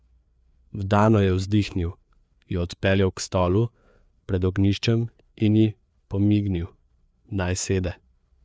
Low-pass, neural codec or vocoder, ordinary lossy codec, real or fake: none; codec, 16 kHz, 4 kbps, FreqCodec, larger model; none; fake